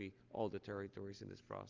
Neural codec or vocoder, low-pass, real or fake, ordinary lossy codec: none; 7.2 kHz; real; Opus, 32 kbps